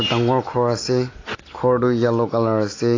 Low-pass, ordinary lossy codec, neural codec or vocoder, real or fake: 7.2 kHz; AAC, 32 kbps; none; real